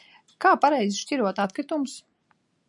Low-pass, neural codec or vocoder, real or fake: 10.8 kHz; none; real